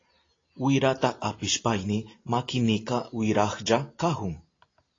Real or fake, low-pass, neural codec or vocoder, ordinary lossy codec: real; 7.2 kHz; none; AAC, 32 kbps